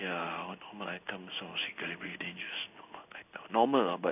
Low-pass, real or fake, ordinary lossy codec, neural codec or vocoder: 3.6 kHz; fake; none; codec, 16 kHz in and 24 kHz out, 1 kbps, XY-Tokenizer